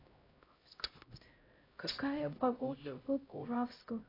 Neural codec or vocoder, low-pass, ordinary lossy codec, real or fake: codec, 16 kHz, 0.5 kbps, X-Codec, HuBERT features, trained on LibriSpeech; 5.4 kHz; AAC, 24 kbps; fake